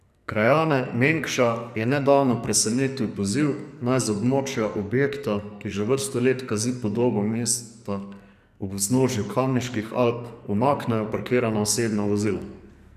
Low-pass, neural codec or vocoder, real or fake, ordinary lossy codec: 14.4 kHz; codec, 44.1 kHz, 2.6 kbps, SNAC; fake; none